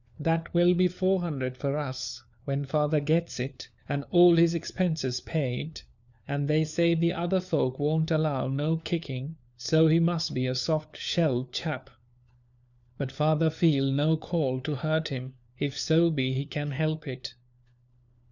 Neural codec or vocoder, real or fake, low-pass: codec, 16 kHz, 4 kbps, FunCodec, trained on LibriTTS, 50 frames a second; fake; 7.2 kHz